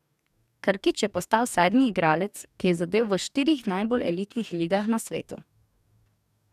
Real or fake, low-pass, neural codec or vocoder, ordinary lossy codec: fake; 14.4 kHz; codec, 44.1 kHz, 2.6 kbps, DAC; none